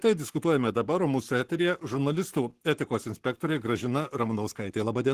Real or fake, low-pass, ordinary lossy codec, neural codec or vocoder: fake; 14.4 kHz; Opus, 16 kbps; codec, 44.1 kHz, 7.8 kbps, Pupu-Codec